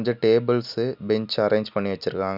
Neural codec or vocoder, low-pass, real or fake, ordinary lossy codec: none; 5.4 kHz; real; none